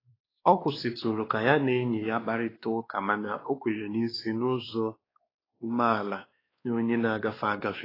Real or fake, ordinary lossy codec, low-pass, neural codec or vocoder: fake; AAC, 24 kbps; 5.4 kHz; codec, 16 kHz, 2 kbps, X-Codec, WavLM features, trained on Multilingual LibriSpeech